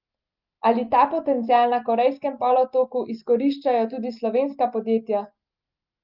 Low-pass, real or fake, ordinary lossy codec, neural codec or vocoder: 5.4 kHz; real; Opus, 24 kbps; none